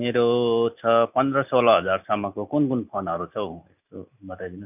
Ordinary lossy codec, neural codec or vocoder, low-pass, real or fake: none; none; 3.6 kHz; real